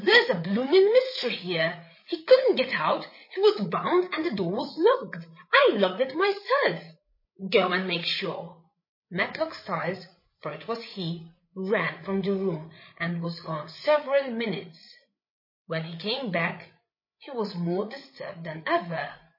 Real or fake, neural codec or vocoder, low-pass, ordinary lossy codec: fake; codec, 16 kHz, 8 kbps, FreqCodec, larger model; 5.4 kHz; MP3, 24 kbps